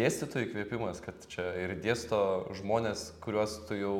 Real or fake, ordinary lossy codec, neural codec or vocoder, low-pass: real; MP3, 96 kbps; none; 19.8 kHz